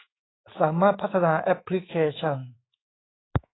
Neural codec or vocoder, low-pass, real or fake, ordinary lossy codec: autoencoder, 48 kHz, 128 numbers a frame, DAC-VAE, trained on Japanese speech; 7.2 kHz; fake; AAC, 16 kbps